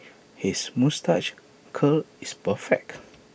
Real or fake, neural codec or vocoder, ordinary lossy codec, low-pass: real; none; none; none